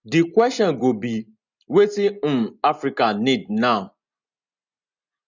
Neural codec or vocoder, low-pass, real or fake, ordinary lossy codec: none; 7.2 kHz; real; none